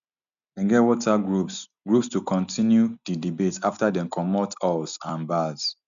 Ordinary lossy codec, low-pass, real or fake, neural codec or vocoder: none; 7.2 kHz; real; none